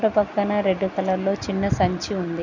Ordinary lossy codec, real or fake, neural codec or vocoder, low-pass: none; real; none; 7.2 kHz